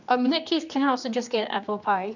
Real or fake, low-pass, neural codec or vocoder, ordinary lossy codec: fake; 7.2 kHz; codec, 16 kHz, 2 kbps, X-Codec, HuBERT features, trained on general audio; none